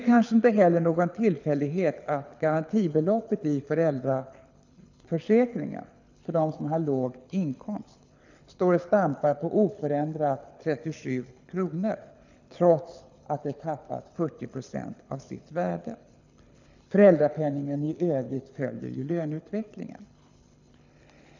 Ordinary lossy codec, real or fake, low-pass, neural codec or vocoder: none; fake; 7.2 kHz; codec, 24 kHz, 6 kbps, HILCodec